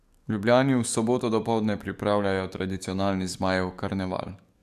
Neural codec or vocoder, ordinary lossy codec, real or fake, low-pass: codec, 44.1 kHz, 7.8 kbps, DAC; none; fake; 14.4 kHz